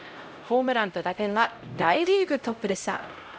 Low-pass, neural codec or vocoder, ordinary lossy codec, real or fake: none; codec, 16 kHz, 0.5 kbps, X-Codec, HuBERT features, trained on LibriSpeech; none; fake